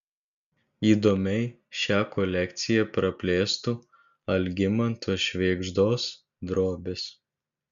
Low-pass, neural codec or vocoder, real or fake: 7.2 kHz; none; real